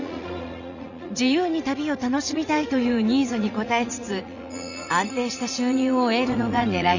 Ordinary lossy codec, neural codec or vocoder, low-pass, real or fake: none; vocoder, 44.1 kHz, 80 mel bands, Vocos; 7.2 kHz; fake